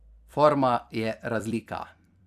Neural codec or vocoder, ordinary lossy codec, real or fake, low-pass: vocoder, 44.1 kHz, 128 mel bands every 256 samples, BigVGAN v2; none; fake; 14.4 kHz